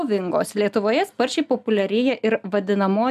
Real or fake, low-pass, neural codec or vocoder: real; 14.4 kHz; none